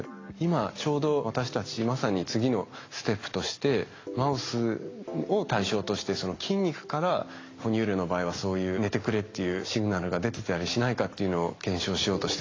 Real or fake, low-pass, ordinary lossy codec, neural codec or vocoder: real; 7.2 kHz; AAC, 32 kbps; none